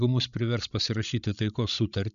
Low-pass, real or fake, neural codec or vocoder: 7.2 kHz; fake; codec, 16 kHz, 16 kbps, FreqCodec, larger model